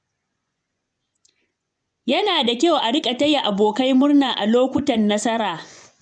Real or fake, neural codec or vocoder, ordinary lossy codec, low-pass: fake; vocoder, 24 kHz, 100 mel bands, Vocos; none; 9.9 kHz